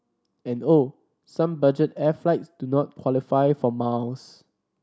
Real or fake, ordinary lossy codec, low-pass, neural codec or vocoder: real; none; none; none